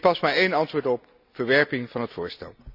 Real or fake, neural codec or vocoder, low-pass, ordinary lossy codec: real; none; 5.4 kHz; none